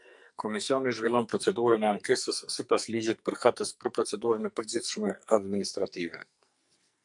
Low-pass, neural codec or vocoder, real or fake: 10.8 kHz; codec, 44.1 kHz, 2.6 kbps, SNAC; fake